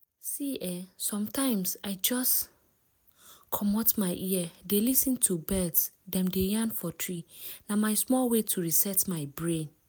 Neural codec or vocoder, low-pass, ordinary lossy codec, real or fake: none; none; none; real